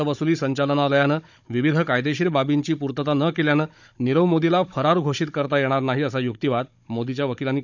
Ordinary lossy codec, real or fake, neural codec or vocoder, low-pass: none; fake; codec, 16 kHz, 16 kbps, FunCodec, trained on Chinese and English, 50 frames a second; 7.2 kHz